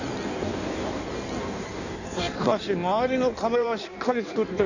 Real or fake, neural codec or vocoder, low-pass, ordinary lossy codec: fake; codec, 16 kHz in and 24 kHz out, 1.1 kbps, FireRedTTS-2 codec; 7.2 kHz; none